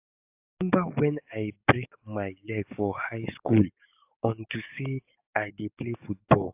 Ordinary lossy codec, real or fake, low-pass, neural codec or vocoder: AAC, 32 kbps; fake; 3.6 kHz; codec, 44.1 kHz, 7.8 kbps, DAC